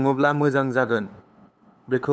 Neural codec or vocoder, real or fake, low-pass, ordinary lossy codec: codec, 16 kHz, 8 kbps, FunCodec, trained on LibriTTS, 25 frames a second; fake; none; none